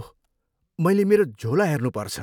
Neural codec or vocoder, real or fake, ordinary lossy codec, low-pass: none; real; none; 19.8 kHz